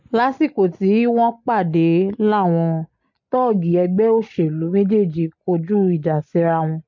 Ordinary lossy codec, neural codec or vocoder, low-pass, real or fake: MP3, 48 kbps; none; 7.2 kHz; real